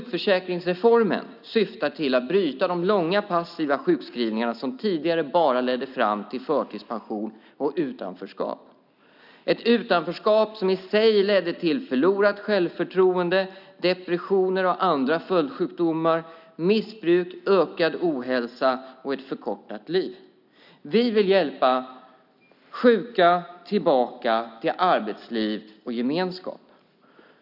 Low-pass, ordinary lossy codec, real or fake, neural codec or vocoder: 5.4 kHz; none; real; none